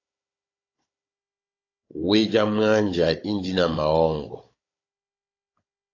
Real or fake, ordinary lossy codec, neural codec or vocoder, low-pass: fake; AAC, 32 kbps; codec, 16 kHz, 16 kbps, FunCodec, trained on Chinese and English, 50 frames a second; 7.2 kHz